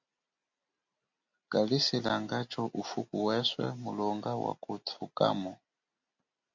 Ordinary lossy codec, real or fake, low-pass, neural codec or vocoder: MP3, 48 kbps; real; 7.2 kHz; none